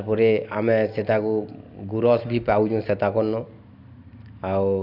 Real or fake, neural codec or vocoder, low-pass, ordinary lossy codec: real; none; 5.4 kHz; none